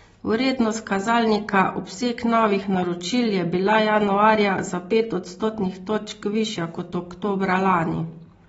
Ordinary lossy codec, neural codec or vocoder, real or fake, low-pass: AAC, 24 kbps; none; real; 19.8 kHz